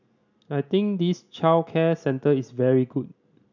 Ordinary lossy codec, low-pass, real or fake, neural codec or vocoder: none; 7.2 kHz; real; none